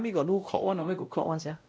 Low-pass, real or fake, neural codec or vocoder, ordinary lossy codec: none; fake; codec, 16 kHz, 0.5 kbps, X-Codec, WavLM features, trained on Multilingual LibriSpeech; none